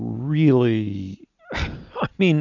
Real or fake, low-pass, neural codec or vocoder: real; 7.2 kHz; none